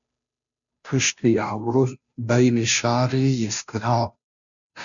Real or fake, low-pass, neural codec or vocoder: fake; 7.2 kHz; codec, 16 kHz, 0.5 kbps, FunCodec, trained on Chinese and English, 25 frames a second